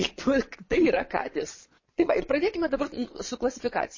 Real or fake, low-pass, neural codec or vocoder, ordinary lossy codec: fake; 7.2 kHz; vocoder, 22.05 kHz, 80 mel bands, Vocos; MP3, 32 kbps